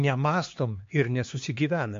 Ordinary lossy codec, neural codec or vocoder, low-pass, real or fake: MP3, 48 kbps; codec, 16 kHz, 2 kbps, X-Codec, HuBERT features, trained on LibriSpeech; 7.2 kHz; fake